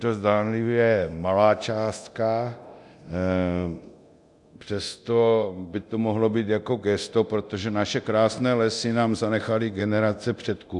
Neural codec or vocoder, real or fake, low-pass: codec, 24 kHz, 0.9 kbps, DualCodec; fake; 10.8 kHz